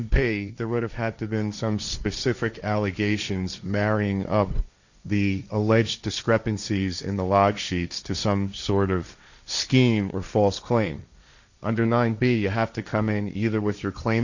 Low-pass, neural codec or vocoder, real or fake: 7.2 kHz; codec, 16 kHz, 1.1 kbps, Voila-Tokenizer; fake